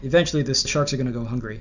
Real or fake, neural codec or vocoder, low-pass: real; none; 7.2 kHz